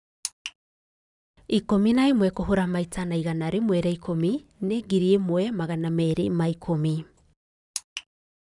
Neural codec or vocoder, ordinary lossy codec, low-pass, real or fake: none; none; 10.8 kHz; real